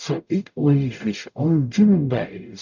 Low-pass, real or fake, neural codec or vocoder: 7.2 kHz; fake; codec, 44.1 kHz, 0.9 kbps, DAC